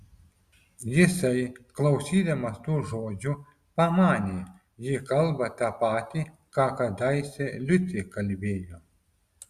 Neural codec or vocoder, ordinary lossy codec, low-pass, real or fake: none; Opus, 64 kbps; 14.4 kHz; real